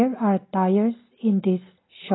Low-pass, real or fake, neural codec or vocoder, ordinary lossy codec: 7.2 kHz; real; none; AAC, 16 kbps